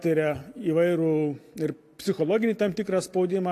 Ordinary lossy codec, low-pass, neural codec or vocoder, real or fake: AAC, 64 kbps; 14.4 kHz; none; real